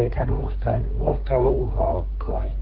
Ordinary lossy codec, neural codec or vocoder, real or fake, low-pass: Opus, 16 kbps; codec, 24 kHz, 1 kbps, SNAC; fake; 5.4 kHz